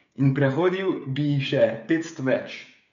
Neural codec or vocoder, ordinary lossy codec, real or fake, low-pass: codec, 16 kHz, 8 kbps, FreqCodec, smaller model; none; fake; 7.2 kHz